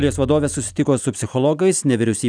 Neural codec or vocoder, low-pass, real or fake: none; 9.9 kHz; real